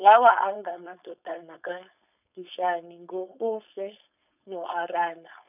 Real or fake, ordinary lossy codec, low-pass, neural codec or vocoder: fake; none; 3.6 kHz; codec, 16 kHz, 4.8 kbps, FACodec